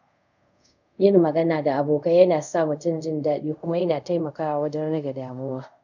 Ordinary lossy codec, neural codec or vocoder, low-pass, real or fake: none; codec, 24 kHz, 0.5 kbps, DualCodec; 7.2 kHz; fake